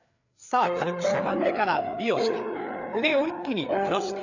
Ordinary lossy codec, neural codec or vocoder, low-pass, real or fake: none; codec, 16 kHz, 4 kbps, FreqCodec, larger model; 7.2 kHz; fake